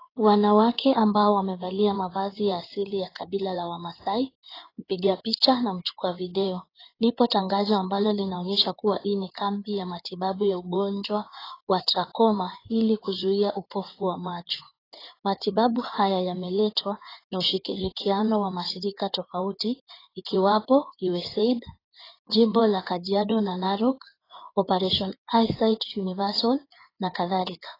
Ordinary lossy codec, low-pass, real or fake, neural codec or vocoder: AAC, 24 kbps; 5.4 kHz; fake; codec, 16 kHz in and 24 kHz out, 2.2 kbps, FireRedTTS-2 codec